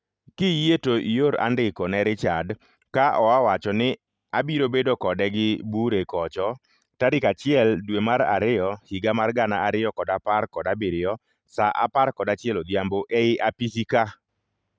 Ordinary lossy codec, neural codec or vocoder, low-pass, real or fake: none; none; none; real